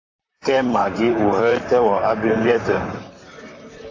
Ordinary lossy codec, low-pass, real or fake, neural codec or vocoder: AAC, 32 kbps; 7.2 kHz; fake; vocoder, 44.1 kHz, 128 mel bands, Pupu-Vocoder